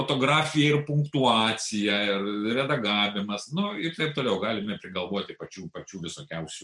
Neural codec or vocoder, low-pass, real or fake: none; 10.8 kHz; real